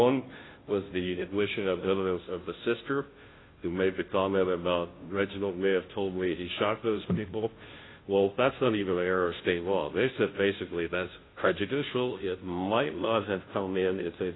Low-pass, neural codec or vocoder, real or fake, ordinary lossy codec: 7.2 kHz; codec, 16 kHz, 0.5 kbps, FunCodec, trained on Chinese and English, 25 frames a second; fake; AAC, 16 kbps